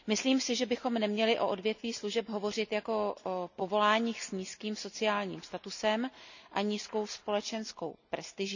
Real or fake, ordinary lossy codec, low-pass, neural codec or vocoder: real; none; 7.2 kHz; none